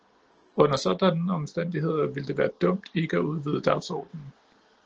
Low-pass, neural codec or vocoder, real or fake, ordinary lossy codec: 7.2 kHz; none; real; Opus, 16 kbps